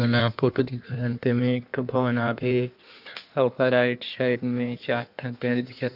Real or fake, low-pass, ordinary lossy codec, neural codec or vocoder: fake; 5.4 kHz; none; codec, 16 kHz in and 24 kHz out, 1.1 kbps, FireRedTTS-2 codec